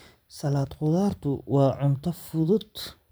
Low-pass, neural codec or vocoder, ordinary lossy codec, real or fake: none; vocoder, 44.1 kHz, 128 mel bands every 512 samples, BigVGAN v2; none; fake